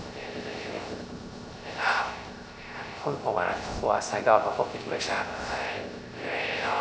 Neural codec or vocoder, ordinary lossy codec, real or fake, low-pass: codec, 16 kHz, 0.3 kbps, FocalCodec; none; fake; none